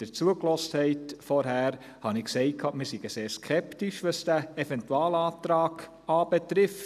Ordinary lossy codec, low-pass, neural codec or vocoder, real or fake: none; 14.4 kHz; none; real